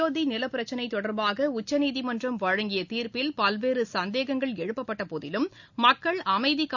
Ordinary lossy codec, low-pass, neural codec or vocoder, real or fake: none; 7.2 kHz; none; real